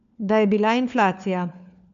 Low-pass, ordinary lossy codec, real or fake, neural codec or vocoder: 7.2 kHz; none; fake; codec, 16 kHz, 4 kbps, FunCodec, trained on LibriTTS, 50 frames a second